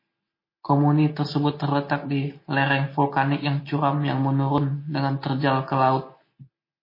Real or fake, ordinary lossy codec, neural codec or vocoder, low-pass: real; MP3, 24 kbps; none; 5.4 kHz